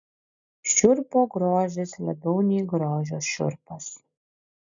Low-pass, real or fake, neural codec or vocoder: 7.2 kHz; real; none